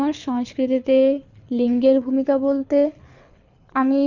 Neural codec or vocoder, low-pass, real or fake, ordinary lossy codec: codec, 24 kHz, 6 kbps, HILCodec; 7.2 kHz; fake; none